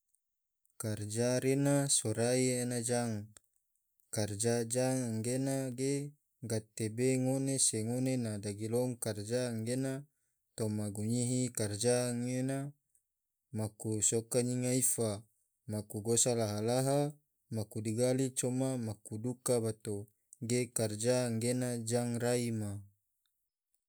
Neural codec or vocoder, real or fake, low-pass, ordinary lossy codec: none; real; none; none